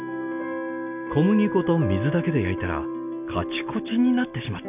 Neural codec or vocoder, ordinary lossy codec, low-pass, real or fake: none; none; 3.6 kHz; real